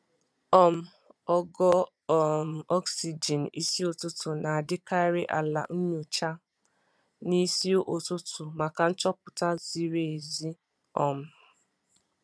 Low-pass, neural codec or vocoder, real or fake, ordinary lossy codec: none; vocoder, 22.05 kHz, 80 mel bands, Vocos; fake; none